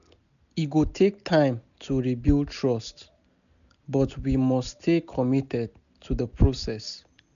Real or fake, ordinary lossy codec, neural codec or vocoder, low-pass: real; none; none; 7.2 kHz